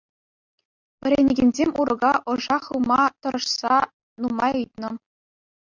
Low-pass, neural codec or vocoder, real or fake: 7.2 kHz; none; real